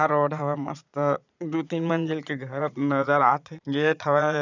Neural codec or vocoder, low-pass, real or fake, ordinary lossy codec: vocoder, 22.05 kHz, 80 mel bands, Vocos; 7.2 kHz; fake; none